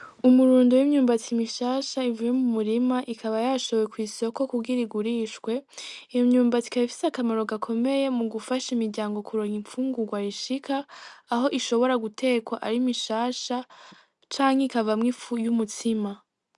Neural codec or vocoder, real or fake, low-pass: none; real; 10.8 kHz